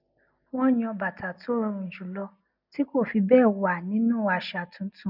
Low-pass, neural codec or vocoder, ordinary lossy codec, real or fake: 5.4 kHz; none; none; real